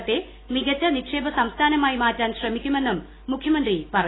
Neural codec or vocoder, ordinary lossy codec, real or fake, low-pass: none; AAC, 16 kbps; real; 7.2 kHz